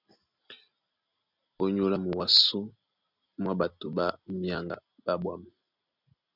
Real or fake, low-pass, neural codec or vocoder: real; 5.4 kHz; none